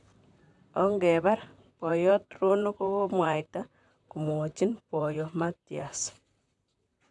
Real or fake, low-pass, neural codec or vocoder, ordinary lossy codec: fake; 10.8 kHz; vocoder, 44.1 kHz, 128 mel bands, Pupu-Vocoder; none